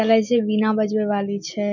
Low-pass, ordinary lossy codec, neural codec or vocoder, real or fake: 7.2 kHz; none; none; real